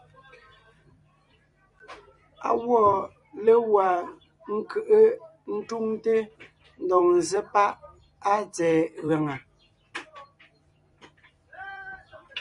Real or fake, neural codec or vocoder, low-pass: fake; vocoder, 44.1 kHz, 128 mel bands every 256 samples, BigVGAN v2; 10.8 kHz